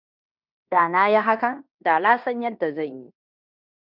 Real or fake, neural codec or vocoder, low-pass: fake; codec, 16 kHz in and 24 kHz out, 0.9 kbps, LongCat-Audio-Codec, fine tuned four codebook decoder; 5.4 kHz